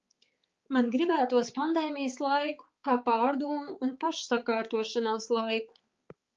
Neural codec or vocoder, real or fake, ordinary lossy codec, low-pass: codec, 16 kHz, 4 kbps, X-Codec, HuBERT features, trained on balanced general audio; fake; Opus, 32 kbps; 7.2 kHz